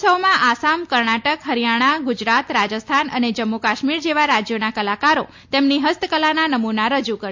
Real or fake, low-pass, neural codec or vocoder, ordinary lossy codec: real; 7.2 kHz; none; MP3, 48 kbps